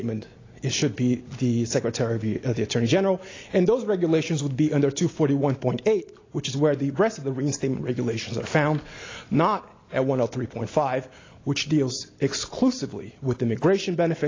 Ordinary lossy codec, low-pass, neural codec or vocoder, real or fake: AAC, 32 kbps; 7.2 kHz; none; real